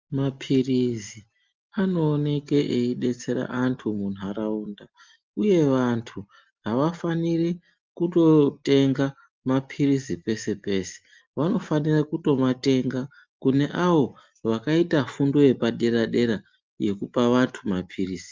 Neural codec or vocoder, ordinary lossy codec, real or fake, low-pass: none; Opus, 32 kbps; real; 7.2 kHz